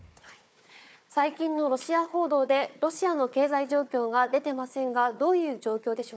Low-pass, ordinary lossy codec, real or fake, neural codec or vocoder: none; none; fake; codec, 16 kHz, 4 kbps, FunCodec, trained on Chinese and English, 50 frames a second